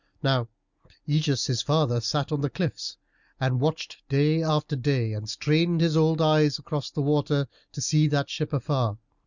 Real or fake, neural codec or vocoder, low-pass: real; none; 7.2 kHz